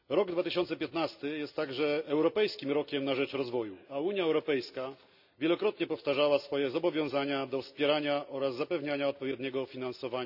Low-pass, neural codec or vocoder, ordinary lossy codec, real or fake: 5.4 kHz; none; none; real